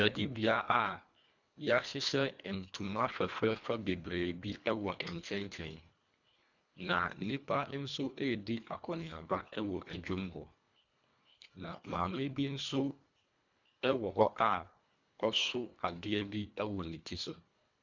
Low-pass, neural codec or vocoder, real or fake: 7.2 kHz; codec, 24 kHz, 1.5 kbps, HILCodec; fake